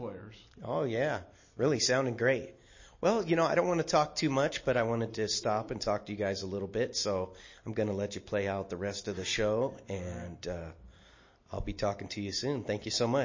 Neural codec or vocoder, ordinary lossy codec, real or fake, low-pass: none; MP3, 32 kbps; real; 7.2 kHz